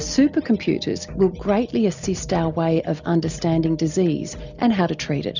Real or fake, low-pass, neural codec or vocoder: real; 7.2 kHz; none